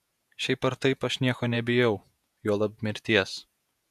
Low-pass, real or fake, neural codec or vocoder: 14.4 kHz; fake; vocoder, 48 kHz, 128 mel bands, Vocos